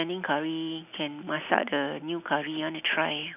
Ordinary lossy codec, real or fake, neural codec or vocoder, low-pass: none; real; none; 3.6 kHz